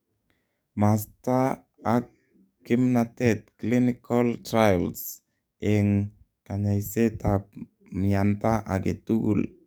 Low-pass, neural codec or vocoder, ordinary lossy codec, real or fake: none; codec, 44.1 kHz, 7.8 kbps, DAC; none; fake